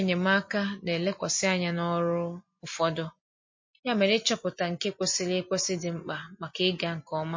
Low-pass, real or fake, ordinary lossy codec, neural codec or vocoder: 7.2 kHz; real; MP3, 32 kbps; none